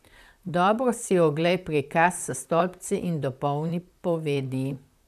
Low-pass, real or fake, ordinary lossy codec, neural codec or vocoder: 14.4 kHz; fake; none; vocoder, 44.1 kHz, 128 mel bands, Pupu-Vocoder